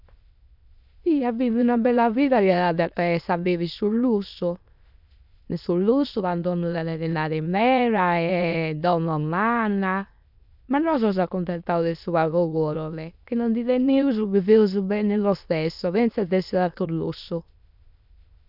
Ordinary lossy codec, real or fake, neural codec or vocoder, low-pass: none; fake; autoencoder, 22.05 kHz, a latent of 192 numbers a frame, VITS, trained on many speakers; 5.4 kHz